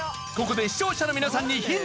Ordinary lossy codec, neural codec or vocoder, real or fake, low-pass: none; none; real; none